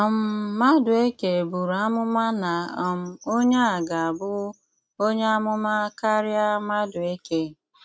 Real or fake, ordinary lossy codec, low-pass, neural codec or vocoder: real; none; none; none